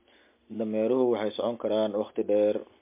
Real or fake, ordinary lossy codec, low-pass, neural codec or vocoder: real; MP3, 24 kbps; 3.6 kHz; none